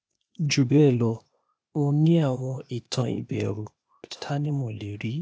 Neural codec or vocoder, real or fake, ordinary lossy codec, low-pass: codec, 16 kHz, 0.8 kbps, ZipCodec; fake; none; none